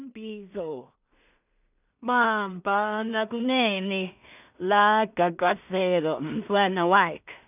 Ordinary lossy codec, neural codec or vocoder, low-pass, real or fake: none; codec, 16 kHz in and 24 kHz out, 0.4 kbps, LongCat-Audio-Codec, two codebook decoder; 3.6 kHz; fake